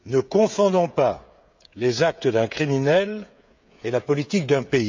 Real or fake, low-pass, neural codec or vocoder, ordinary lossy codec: fake; 7.2 kHz; codec, 16 kHz, 16 kbps, FreqCodec, smaller model; MP3, 64 kbps